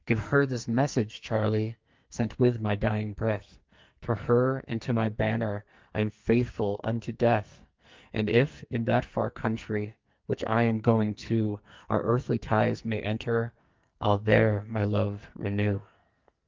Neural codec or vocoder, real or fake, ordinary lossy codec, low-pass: codec, 44.1 kHz, 2.6 kbps, SNAC; fake; Opus, 32 kbps; 7.2 kHz